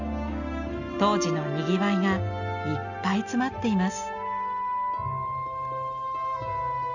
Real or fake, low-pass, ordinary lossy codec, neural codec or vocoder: real; 7.2 kHz; none; none